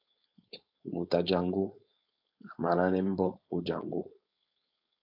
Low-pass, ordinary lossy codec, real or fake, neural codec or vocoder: 5.4 kHz; AAC, 48 kbps; fake; codec, 16 kHz, 4.8 kbps, FACodec